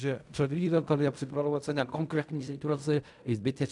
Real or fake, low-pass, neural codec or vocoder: fake; 10.8 kHz; codec, 16 kHz in and 24 kHz out, 0.4 kbps, LongCat-Audio-Codec, fine tuned four codebook decoder